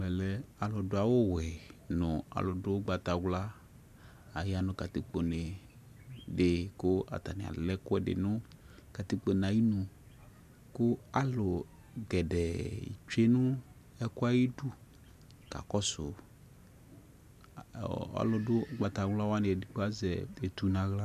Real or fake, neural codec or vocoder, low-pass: real; none; 14.4 kHz